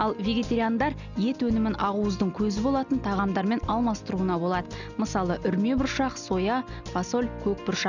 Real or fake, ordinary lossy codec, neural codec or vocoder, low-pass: real; none; none; 7.2 kHz